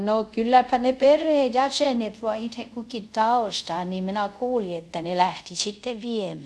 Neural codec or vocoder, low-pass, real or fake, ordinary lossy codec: codec, 24 kHz, 0.5 kbps, DualCodec; none; fake; none